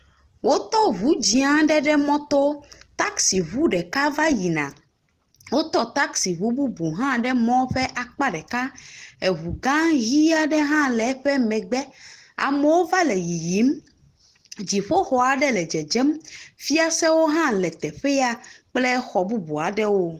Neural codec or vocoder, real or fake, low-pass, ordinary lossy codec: none; real; 14.4 kHz; Opus, 16 kbps